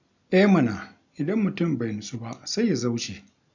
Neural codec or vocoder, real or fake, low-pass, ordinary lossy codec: none; real; 7.2 kHz; none